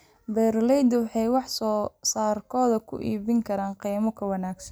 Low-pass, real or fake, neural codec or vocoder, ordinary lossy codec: none; real; none; none